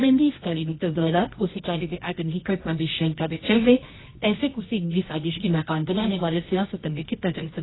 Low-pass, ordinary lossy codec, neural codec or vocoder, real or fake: 7.2 kHz; AAC, 16 kbps; codec, 24 kHz, 0.9 kbps, WavTokenizer, medium music audio release; fake